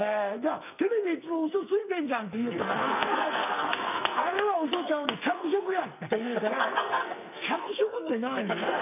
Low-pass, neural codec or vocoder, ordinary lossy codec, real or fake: 3.6 kHz; codec, 44.1 kHz, 2.6 kbps, SNAC; none; fake